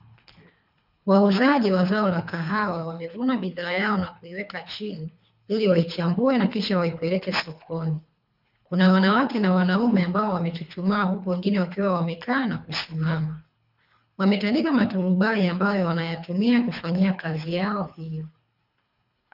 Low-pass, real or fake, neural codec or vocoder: 5.4 kHz; fake; codec, 24 kHz, 3 kbps, HILCodec